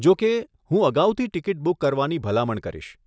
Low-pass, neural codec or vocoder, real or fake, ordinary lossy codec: none; none; real; none